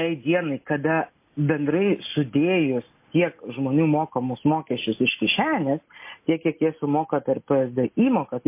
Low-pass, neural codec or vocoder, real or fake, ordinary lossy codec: 3.6 kHz; none; real; MP3, 24 kbps